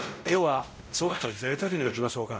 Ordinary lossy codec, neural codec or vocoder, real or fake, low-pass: none; codec, 16 kHz, 0.5 kbps, X-Codec, WavLM features, trained on Multilingual LibriSpeech; fake; none